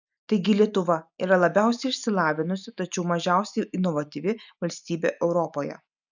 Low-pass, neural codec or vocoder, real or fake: 7.2 kHz; none; real